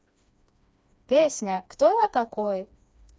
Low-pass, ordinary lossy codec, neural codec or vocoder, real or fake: none; none; codec, 16 kHz, 2 kbps, FreqCodec, smaller model; fake